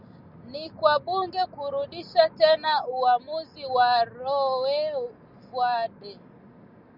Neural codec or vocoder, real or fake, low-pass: none; real; 5.4 kHz